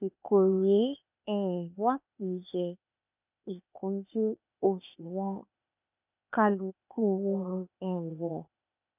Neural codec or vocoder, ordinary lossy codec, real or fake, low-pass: codec, 16 kHz, 0.8 kbps, ZipCodec; none; fake; 3.6 kHz